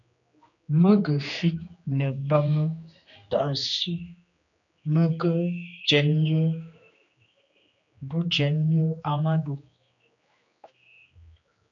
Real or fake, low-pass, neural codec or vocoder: fake; 7.2 kHz; codec, 16 kHz, 2 kbps, X-Codec, HuBERT features, trained on general audio